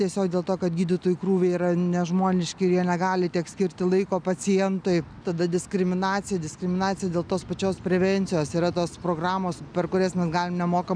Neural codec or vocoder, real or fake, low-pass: none; real; 9.9 kHz